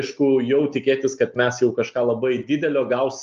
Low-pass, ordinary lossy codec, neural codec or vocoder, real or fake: 7.2 kHz; Opus, 24 kbps; none; real